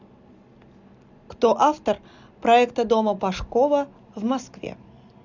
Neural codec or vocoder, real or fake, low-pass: none; real; 7.2 kHz